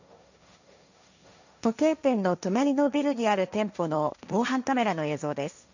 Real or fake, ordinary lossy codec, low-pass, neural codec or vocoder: fake; none; 7.2 kHz; codec, 16 kHz, 1.1 kbps, Voila-Tokenizer